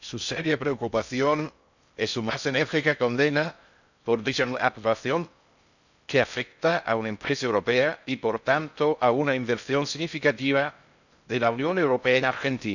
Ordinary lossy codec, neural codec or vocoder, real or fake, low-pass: none; codec, 16 kHz in and 24 kHz out, 0.6 kbps, FocalCodec, streaming, 2048 codes; fake; 7.2 kHz